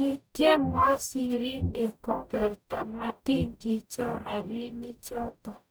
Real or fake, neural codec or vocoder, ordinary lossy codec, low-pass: fake; codec, 44.1 kHz, 0.9 kbps, DAC; none; none